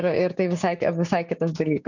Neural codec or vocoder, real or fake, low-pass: codec, 16 kHz, 6 kbps, DAC; fake; 7.2 kHz